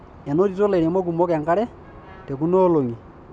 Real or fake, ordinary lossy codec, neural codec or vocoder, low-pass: real; none; none; 9.9 kHz